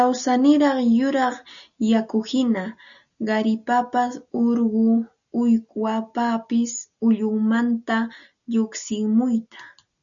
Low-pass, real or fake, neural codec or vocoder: 7.2 kHz; real; none